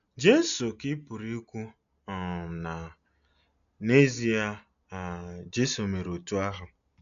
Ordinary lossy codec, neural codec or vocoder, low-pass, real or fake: none; none; 7.2 kHz; real